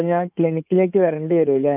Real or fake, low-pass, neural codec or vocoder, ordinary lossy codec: fake; 3.6 kHz; codec, 16 kHz in and 24 kHz out, 2.2 kbps, FireRedTTS-2 codec; none